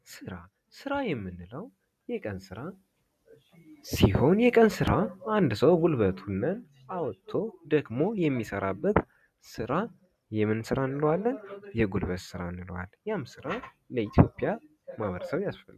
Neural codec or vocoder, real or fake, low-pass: none; real; 14.4 kHz